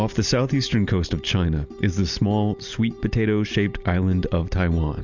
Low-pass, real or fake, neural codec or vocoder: 7.2 kHz; real; none